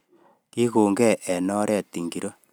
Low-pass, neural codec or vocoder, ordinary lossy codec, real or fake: none; none; none; real